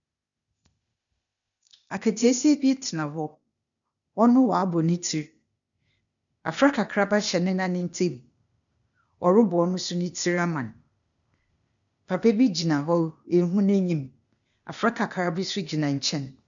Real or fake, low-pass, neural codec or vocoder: fake; 7.2 kHz; codec, 16 kHz, 0.8 kbps, ZipCodec